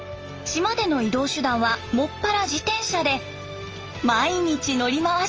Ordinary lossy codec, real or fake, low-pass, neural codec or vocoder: Opus, 24 kbps; real; 7.2 kHz; none